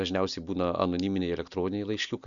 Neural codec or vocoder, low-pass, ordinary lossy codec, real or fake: none; 7.2 kHz; Opus, 64 kbps; real